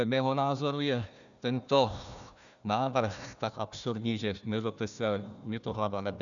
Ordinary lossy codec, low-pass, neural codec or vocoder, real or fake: MP3, 96 kbps; 7.2 kHz; codec, 16 kHz, 1 kbps, FunCodec, trained on Chinese and English, 50 frames a second; fake